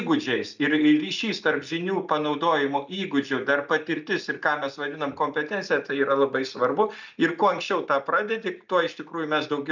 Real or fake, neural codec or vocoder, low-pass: real; none; 7.2 kHz